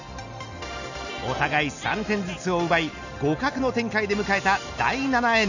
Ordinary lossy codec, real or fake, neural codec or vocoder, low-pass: none; real; none; 7.2 kHz